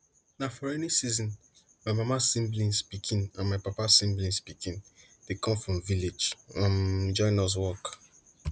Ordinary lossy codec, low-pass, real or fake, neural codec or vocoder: none; none; real; none